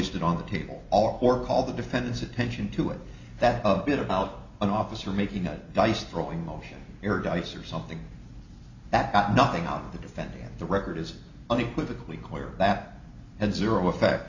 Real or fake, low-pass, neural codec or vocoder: real; 7.2 kHz; none